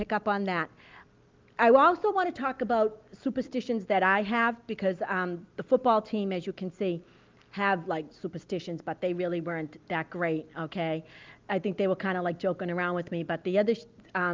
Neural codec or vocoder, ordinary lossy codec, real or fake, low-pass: none; Opus, 24 kbps; real; 7.2 kHz